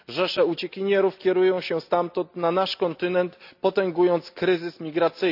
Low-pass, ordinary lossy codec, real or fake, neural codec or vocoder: 5.4 kHz; none; real; none